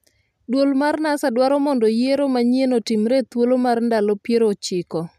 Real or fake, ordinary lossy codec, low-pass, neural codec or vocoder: real; none; 14.4 kHz; none